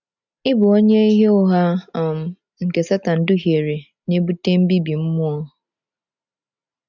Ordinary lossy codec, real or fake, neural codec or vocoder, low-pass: none; real; none; 7.2 kHz